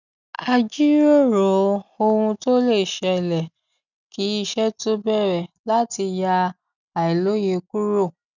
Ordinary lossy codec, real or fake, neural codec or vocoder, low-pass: none; real; none; 7.2 kHz